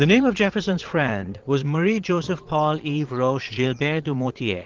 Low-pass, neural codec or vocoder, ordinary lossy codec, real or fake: 7.2 kHz; vocoder, 22.05 kHz, 80 mel bands, Vocos; Opus, 16 kbps; fake